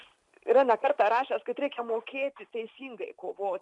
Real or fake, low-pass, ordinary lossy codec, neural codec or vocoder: real; 10.8 kHz; MP3, 64 kbps; none